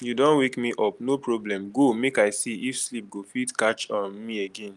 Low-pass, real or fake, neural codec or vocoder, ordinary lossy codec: 10.8 kHz; real; none; Opus, 32 kbps